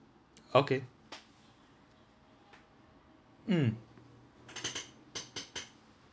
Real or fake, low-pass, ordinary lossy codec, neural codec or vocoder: real; none; none; none